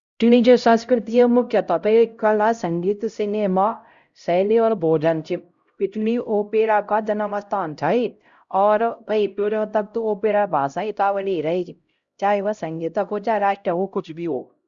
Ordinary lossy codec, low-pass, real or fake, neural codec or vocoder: Opus, 64 kbps; 7.2 kHz; fake; codec, 16 kHz, 0.5 kbps, X-Codec, HuBERT features, trained on LibriSpeech